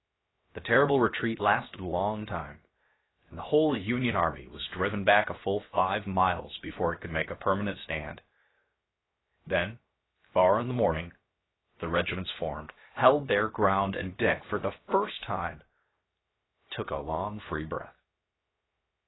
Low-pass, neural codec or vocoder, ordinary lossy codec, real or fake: 7.2 kHz; codec, 16 kHz, 0.7 kbps, FocalCodec; AAC, 16 kbps; fake